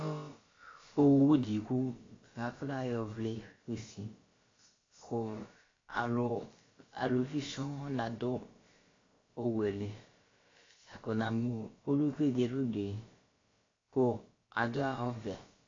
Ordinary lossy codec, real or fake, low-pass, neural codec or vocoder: AAC, 32 kbps; fake; 7.2 kHz; codec, 16 kHz, about 1 kbps, DyCAST, with the encoder's durations